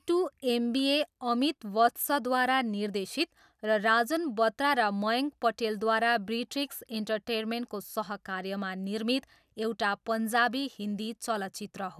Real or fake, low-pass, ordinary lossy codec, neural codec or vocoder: real; 14.4 kHz; none; none